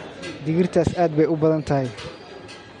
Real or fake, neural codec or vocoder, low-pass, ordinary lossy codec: real; none; 19.8 kHz; MP3, 48 kbps